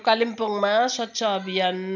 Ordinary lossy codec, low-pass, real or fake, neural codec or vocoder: none; 7.2 kHz; real; none